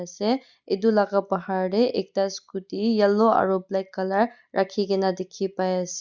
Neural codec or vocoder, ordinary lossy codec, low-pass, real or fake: none; Opus, 64 kbps; 7.2 kHz; real